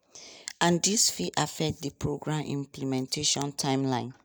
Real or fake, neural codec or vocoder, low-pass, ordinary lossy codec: real; none; none; none